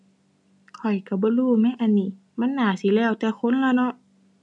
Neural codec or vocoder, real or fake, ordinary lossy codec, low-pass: none; real; none; 10.8 kHz